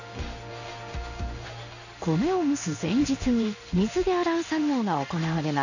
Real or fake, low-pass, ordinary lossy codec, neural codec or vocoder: fake; 7.2 kHz; none; codec, 16 kHz in and 24 kHz out, 1 kbps, XY-Tokenizer